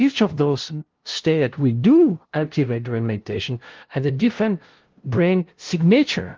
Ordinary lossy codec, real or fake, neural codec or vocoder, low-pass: Opus, 16 kbps; fake; codec, 16 kHz, 0.5 kbps, FunCodec, trained on LibriTTS, 25 frames a second; 7.2 kHz